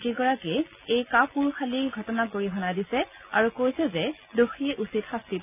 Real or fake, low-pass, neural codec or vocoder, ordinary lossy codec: real; 3.6 kHz; none; none